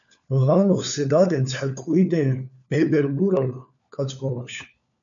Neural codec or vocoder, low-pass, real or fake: codec, 16 kHz, 4 kbps, FunCodec, trained on LibriTTS, 50 frames a second; 7.2 kHz; fake